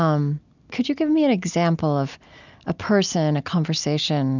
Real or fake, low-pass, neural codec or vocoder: real; 7.2 kHz; none